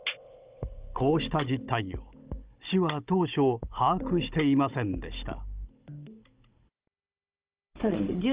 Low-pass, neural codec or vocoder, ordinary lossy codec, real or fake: 3.6 kHz; codec, 16 kHz, 16 kbps, FunCodec, trained on Chinese and English, 50 frames a second; Opus, 24 kbps; fake